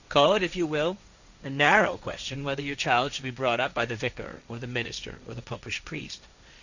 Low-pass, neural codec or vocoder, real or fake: 7.2 kHz; codec, 16 kHz, 1.1 kbps, Voila-Tokenizer; fake